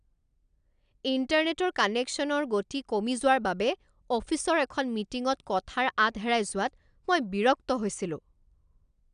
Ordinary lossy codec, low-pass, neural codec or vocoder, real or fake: none; 9.9 kHz; none; real